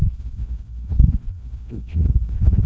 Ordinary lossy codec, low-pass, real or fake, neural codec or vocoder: none; none; fake; codec, 16 kHz, 1 kbps, FreqCodec, larger model